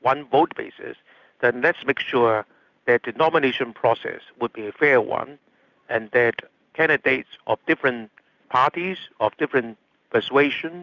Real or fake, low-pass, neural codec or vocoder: real; 7.2 kHz; none